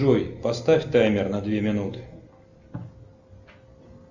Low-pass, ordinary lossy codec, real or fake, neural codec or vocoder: 7.2 kHz; Opus, 64 kbps; real; none